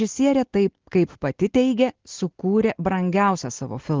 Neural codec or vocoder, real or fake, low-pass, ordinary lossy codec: none; real; 7.2 kHz; Opus, 16 kbps